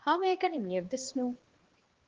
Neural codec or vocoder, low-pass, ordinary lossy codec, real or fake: codec, 16 kHz, 2 kbps, X-Codec, HuBERT features, trained on balanced general audio; 7.2 kHz; Opus, 16 kbps; fake